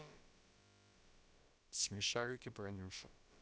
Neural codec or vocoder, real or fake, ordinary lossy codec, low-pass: codec, 16 kHz, about 1 kbps, DyCAST, with the encoder's durations; fake; none; none